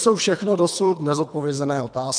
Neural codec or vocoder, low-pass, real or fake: codec, 24 kHz, 3 kbps, HILCodec; 9.9 kHz; fake